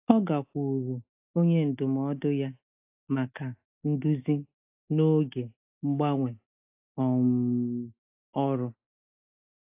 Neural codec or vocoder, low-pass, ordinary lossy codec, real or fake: none; 3.6 kHz; AAC, 32 kbps; real